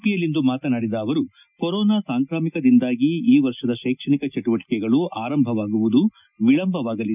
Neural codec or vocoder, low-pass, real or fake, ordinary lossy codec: none; 3.6 kHz; real; none